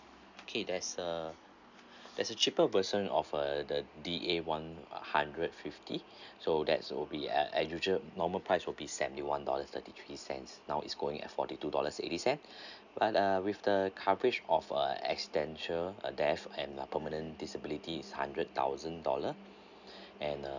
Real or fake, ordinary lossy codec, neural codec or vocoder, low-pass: real; none; none; 7.2 kHz